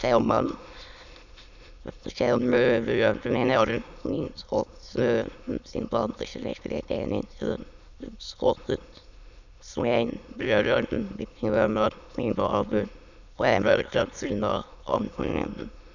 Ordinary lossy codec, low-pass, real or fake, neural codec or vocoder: none; 7.2 kHz; fake; autoencoder, 22.05 kHz, a latent of 192 numbers a frame, VITS, trained on many speakers